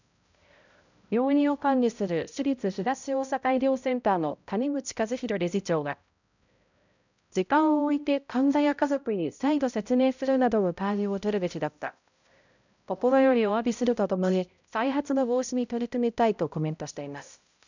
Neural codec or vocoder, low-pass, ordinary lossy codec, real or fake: codec, 16 kHz, 0.5 kbps, X-Codec, HuBERT features, trained on balanced general audio; 7.2 kHz; none; fake